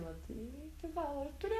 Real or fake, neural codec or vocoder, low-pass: fake; codec, 44.1 kHz, 7.8 kbps, Pupu-Codec; 14.4 kHz